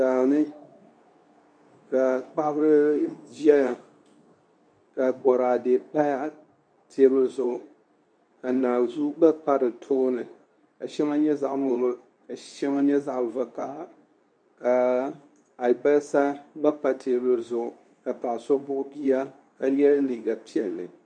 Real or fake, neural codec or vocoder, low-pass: fake; codec, 24 kHz, 0.9 kbps, WavTokenizer, medium speech release version 2; 9.9 kHz